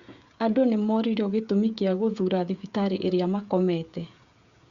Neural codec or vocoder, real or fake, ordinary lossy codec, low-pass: codec, 16 kHz, 16 kbps, FreqCodec, smaller model; fake; Opus, 64 kbps; 7.2 kHz